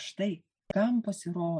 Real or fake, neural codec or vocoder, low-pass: real; none; 9.9 kHz